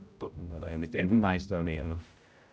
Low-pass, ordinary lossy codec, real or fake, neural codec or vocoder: none; none; fake; codec, 16 kHz, 0.5 kbps, X-Codec, HuBERT features, trained on general audio